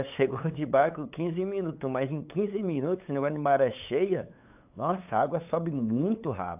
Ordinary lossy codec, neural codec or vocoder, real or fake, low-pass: AAC, 32 kbps; codec, 16 kHz, 8 kbps, FunCodec, trained on LibriTTS, 25 frames a second; fake; 3.6 kHz